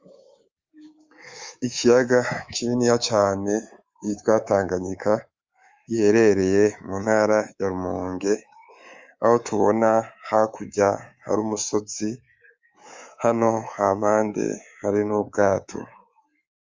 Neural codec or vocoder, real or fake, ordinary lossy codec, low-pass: codec, 24 kHz, 3.1 kbps, DualCodec; fake; Opus, 32 kbps; 7.2 kHz